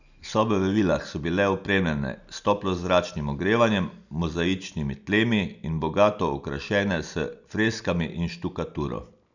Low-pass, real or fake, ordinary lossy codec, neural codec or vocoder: 7.2 kHz; real; none; none